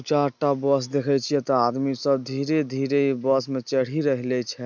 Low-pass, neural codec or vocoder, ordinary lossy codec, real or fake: 7.2 kHz; none; none; real